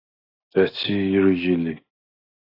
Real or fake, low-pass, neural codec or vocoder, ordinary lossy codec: real; 5.4 kHz; none; AAC, 32 kbps